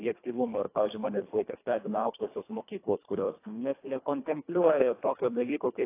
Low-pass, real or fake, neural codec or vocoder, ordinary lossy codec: 3.6 kHz; fake; codec, 24 kHz, 1.5 kbps, HILCodec; AAC, 24 kbps